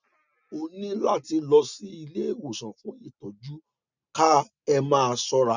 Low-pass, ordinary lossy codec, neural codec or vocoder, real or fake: 7.2 kHz; none; none; real